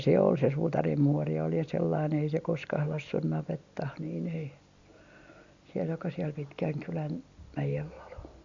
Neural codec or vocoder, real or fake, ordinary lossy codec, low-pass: none; real; Opus, 64 kbps; 7.2 kHz